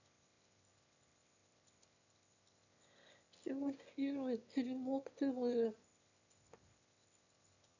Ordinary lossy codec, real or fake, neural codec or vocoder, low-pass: none; fake; autoencoder, 22.05 kHz, a latent of 192 numbers a frame, VITS, trained on one speaker; 7.2 kHz